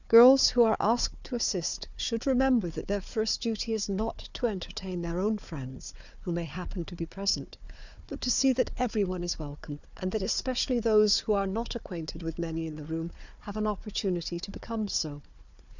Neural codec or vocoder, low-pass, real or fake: codec, 16 kHz, 4 kbps, FunCodec, trained on Chinese and English, 50 frames a second; 7.2 kHz; fake